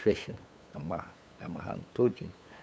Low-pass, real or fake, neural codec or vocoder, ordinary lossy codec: none; fake; codec, 16 kHz, 8 kbps, FunCodec, trained on LibriTTS, 25 frames a second; none